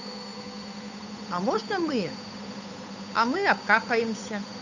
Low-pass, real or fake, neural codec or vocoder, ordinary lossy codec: 7.2 kHz; fake; codec, 16 kHz, 8 kbps, FunCodec, trained on Chinese and English, 25 frames a second; none